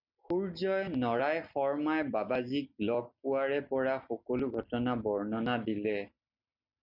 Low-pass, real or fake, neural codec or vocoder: 5.4 kHz; real; none